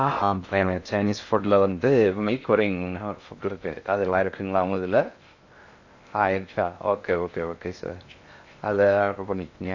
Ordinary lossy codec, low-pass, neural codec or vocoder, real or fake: AAC, 48 kbps; 7.2 kHz; codec, 16 kHz in and 24 kHz out, 0.6 kbps, FocalCodec, streaming, 2048 codes; fake